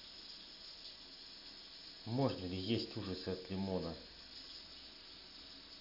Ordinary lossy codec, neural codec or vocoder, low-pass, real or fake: none; none; 5.4 kHz; real